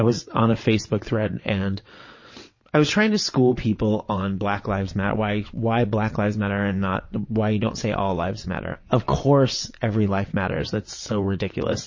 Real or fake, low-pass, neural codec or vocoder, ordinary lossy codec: fake; 7.2 kHz; vocoder, 22.05 kHz, 80 mel bands, WaveNeXt; MP3, 32 kbps